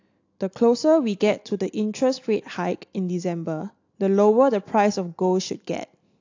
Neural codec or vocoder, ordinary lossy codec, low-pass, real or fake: none; AAC, 48 kbps; 7.2 kHz; real